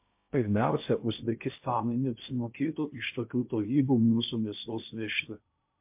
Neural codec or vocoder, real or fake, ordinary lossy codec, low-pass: codec, 16 kHz in and 24 kHz out, 0.6 kbps, FocalCodec, streaming, 2048 codes; fake; MP3, 32 kbps; 3.6 kHz